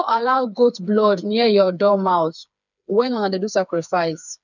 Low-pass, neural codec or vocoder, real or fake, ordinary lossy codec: 7.2 kHz; codec, 16 kHz, 4 kbps, FreqCodec, smaller model; fake; none